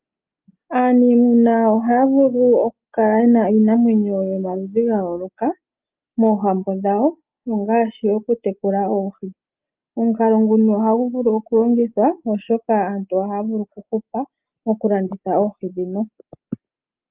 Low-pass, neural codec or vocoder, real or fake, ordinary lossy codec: 3.6 kHz; none; real; Opus, 32 kbps